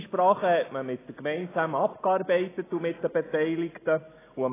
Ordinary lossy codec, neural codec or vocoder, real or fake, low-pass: AAC, 16 kbps; none; real; 3.6 kHz